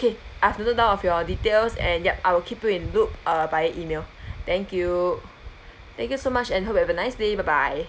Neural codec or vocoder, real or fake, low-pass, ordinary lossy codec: none; real; none; none